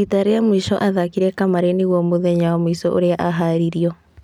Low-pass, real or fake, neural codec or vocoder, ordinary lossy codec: 19.8 kHz; real; none; none